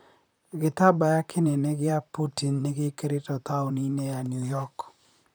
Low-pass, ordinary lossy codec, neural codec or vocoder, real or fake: none; none; vocoder, 44.1 kHz, 128 mel bands, Pupu-Vocoder; fake